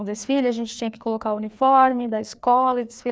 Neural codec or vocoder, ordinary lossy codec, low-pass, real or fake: codec, 16 kHz, 2 kbps, FreqCodec, larger model; none; none; fake